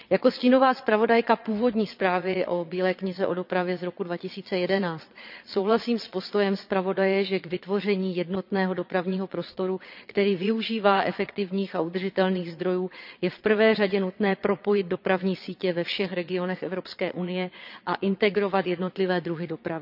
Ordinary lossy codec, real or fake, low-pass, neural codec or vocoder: none; fake; 5.4 kHz; vocoder, 22.05 kHz, 80 mel bands, Vocos